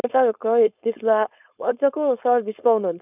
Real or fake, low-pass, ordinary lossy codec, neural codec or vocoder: fake; 3.6 kHz; none; codec, 16 kHz, 4.8 kbps, FACodec